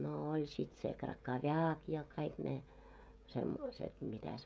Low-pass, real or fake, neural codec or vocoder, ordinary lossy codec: none; fake; codec, 16 kHz, 16 kbps, FreqCodec, smaller model; none